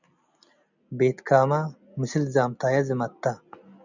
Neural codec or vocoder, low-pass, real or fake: none; 7.2 kHz; real